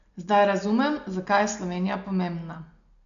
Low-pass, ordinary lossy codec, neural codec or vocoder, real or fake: 7.2 kHz; none; none; real